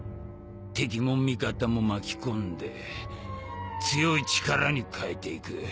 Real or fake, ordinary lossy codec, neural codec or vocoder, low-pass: real; none; none; none